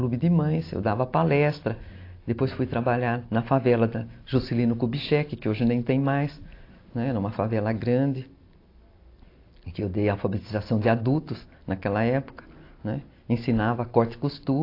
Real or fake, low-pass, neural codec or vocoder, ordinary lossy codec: real; 5.4 kHz; none; AAC, 32 kbps